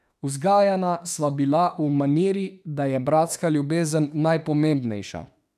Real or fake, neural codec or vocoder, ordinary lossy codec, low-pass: fake; autoencoder, 48 kHz, 32 numbers a frame, DAC-VAE, trained on Japanese speech; none; 14.4 kHz